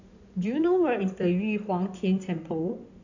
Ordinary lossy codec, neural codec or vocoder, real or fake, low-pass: none; codec, 16 kHz in and 24 kHz out, 2.2 kbps, FireRedTTS-2 codec; fake; 7.2 kHz